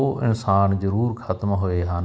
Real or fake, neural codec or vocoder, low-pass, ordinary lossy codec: real; none; none; none